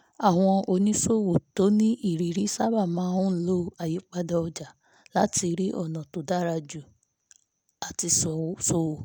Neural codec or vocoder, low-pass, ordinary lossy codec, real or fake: none; none; none; real